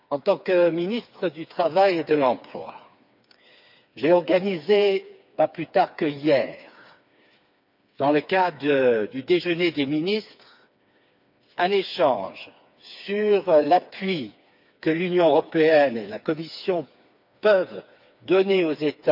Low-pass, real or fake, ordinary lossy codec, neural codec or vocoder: 5.4 kHz; fake; none; codec, 16 kHz, 4 kbps, FreqCodec, smaller model